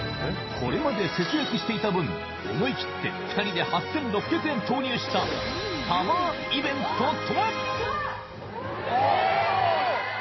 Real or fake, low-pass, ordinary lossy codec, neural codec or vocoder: fake; 7.2 kHz; MP3, 24 kbps; vocoder, 44.1 kHz, 128 mel bands every 256 samples, BigVGAN v2